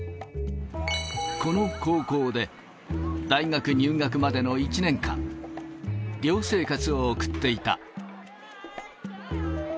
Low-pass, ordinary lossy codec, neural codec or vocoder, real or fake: none; none; none; real